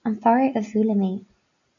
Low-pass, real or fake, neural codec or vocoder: 7.2 kHz; real; none